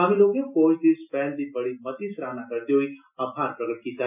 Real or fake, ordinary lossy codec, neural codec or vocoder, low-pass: real; none; none; 3.6 kHz